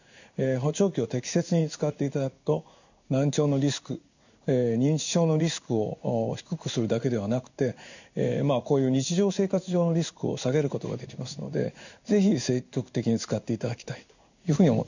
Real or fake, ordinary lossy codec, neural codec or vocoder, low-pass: fake; none; codec, 16 kHz in and 24 kHz out, 1 kbps, XY-Tokenizer; 7.2 kHz